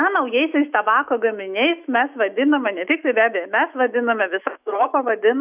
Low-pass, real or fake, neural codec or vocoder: 3.6 kHz; fake; autoencoder, 48 kHz, 128 numbers a frame, DAC-VAE, trained on Japanese speech